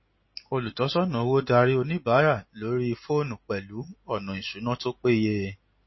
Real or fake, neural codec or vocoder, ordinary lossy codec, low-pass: real; none; MP3, 24 kbps; 7.2 kHz